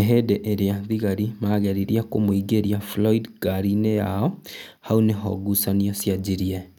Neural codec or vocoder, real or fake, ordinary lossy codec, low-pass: none; real; none; 19.8 kHz